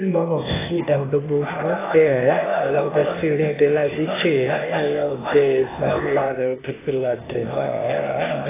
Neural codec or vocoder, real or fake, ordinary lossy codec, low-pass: codec, 16 kHz, 0.8 kbps, ZipCodec; fake; AAC, 16 kbps; 3.6 kHz